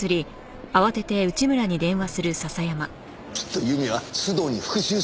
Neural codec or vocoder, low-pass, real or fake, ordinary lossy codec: none; none; real; none